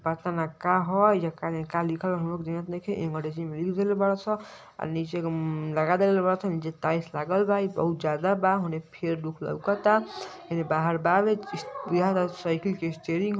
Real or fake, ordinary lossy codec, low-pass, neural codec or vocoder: real; none; none; none